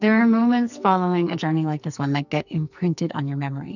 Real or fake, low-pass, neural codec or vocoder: fake; 7.2 kHz; codec, 44.1 kHz, 2.6 kbps, SNAC